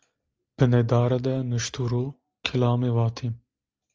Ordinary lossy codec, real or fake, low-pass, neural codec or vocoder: Opus, 24 kbps; real; 7.2 kHz; none